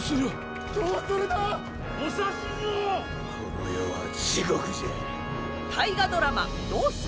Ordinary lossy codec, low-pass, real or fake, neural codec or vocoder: none; none; real; none